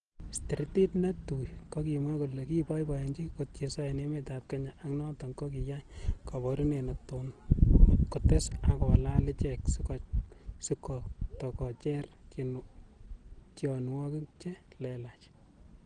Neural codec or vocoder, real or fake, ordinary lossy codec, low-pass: none; real; Opus, 16 kbps; 9.9 kHz